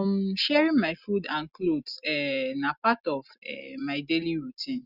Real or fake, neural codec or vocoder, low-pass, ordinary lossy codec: real; none; 5.4 kHz; Opus, 64 kbps